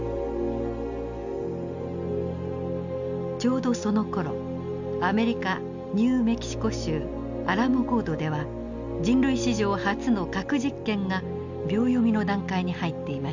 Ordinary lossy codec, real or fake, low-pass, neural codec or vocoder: none; real; 7.2 kHz; none